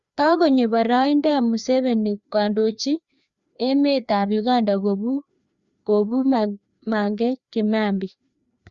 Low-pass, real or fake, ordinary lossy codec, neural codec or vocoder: 7.2 kHz; fake; Opus, 64 kbps; codec, 16 kHz, 2 kbps, FreqCodec, larger model